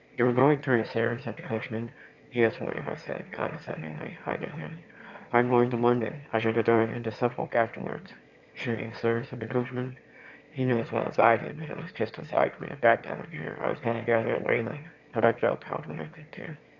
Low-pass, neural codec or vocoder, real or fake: 7.2 kHz; autoencoder, 22.05 kHz, a latent of 192 numbers a frame, VITS, trained on one speaker; fake